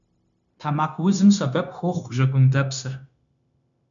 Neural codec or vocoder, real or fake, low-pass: codec, 16 kHz, 0.9 kbps, LongCat-Audio-Codec; fake; 7.2 kHz